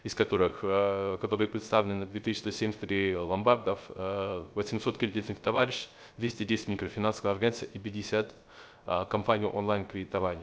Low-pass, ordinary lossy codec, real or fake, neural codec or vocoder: none; none; fake; codec, 16 kHz, 0.3 kbps, FocalCodec